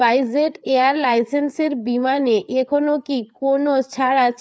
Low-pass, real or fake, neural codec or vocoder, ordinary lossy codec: none; fake; codec, 16 kHz, 4 kbps, FreqCodec, larger model; none